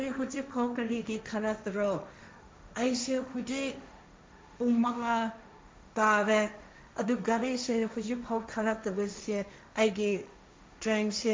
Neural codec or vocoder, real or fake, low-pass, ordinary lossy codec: codec, 16 kHz, 1.1 kbps, Voila-Tokenizer; fake; none; none